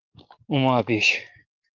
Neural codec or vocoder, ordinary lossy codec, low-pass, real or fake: codec, 44.1 kHz, 7.8 kbps, DAC; Opus, 24 kbps; 7.2 kHz; fake